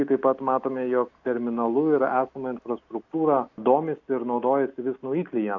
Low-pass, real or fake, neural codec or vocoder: 7.2 kHz; real; none